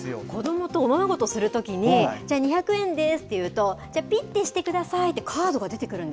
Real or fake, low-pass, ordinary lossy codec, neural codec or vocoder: real; none; none; none